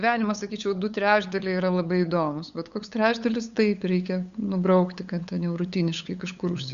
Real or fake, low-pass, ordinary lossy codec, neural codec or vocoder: fake; 7.2 kHz; Opus, 64 kbps; codec, 16 kHz, 8 kbps, FunCodec, trained on LibriTTS, 25 frames a second